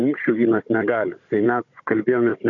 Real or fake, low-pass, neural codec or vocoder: fake; 7.2 kHz; codec, 16 kHz, 16 kbps, FunCodec, trained on Chinese and English, 50 frames a second